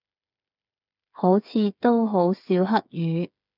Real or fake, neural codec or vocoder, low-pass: fake; codec, 16 kHz, 8 kbps, FreqCodec, smaller model; 5.4 kHz